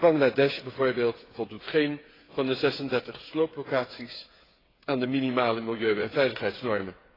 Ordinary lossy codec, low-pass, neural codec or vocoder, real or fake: AAC, 24 kbps; 5.4 kHz; codec, 16 kHz, 8 kbps, FreqCodec, smaller model; fake